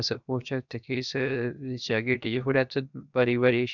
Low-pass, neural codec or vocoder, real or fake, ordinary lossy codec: 7.2 kHz; codec, 16 kHz, 0.7 kbps, FocalCodec; fake; none